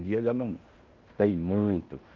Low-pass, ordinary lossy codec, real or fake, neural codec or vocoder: 7.2 kHz; Opus, 32 kbps; fake; codec, 16 kHz in and 24 kHz out, 0.9 kbps, LongCat-Audio-Codec, fine tuned four codebook decoder